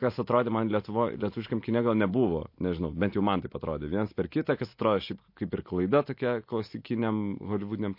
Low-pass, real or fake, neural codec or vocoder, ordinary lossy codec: 5.4 kHz; real; none; MP3, 32 kbps